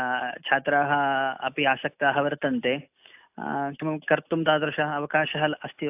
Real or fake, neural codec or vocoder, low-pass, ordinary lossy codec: real; none; 3.6 kHz; AAC, 32 kbps